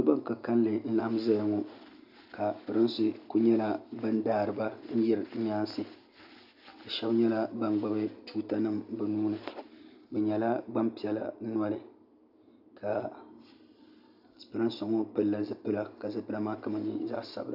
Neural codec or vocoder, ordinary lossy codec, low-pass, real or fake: none; AAC, 48 kbps; 5.4 kHz; real